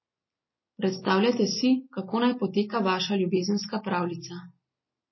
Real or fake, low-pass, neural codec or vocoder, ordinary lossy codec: real; 7.2 kHz; none; MP3, 24 kbps